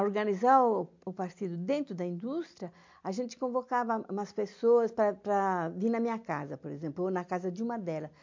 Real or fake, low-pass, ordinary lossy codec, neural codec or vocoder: real; 7.2 kHz; MP3, 48 kbps; none